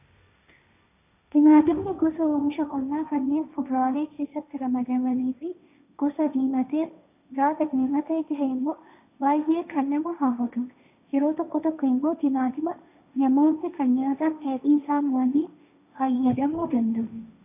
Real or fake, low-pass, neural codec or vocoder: fake; 3.6 kHz; codec, 16 kHz, 1.1 kbps, Voila-Tokenizer